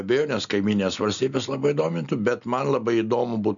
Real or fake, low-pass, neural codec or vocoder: real; 7.2 kHz; none